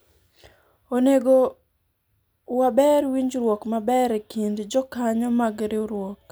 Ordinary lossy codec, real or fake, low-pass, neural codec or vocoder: none; real; none; none